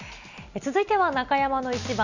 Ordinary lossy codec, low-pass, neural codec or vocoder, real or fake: none; 7.2 kHz; none; real